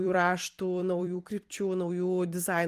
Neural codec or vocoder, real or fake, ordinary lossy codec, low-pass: vocoder, 44.1 kHz, 128 mel bands every 256 samples, BigVGAN v2; fake; Opus, 24 kbps; 14.4 kHz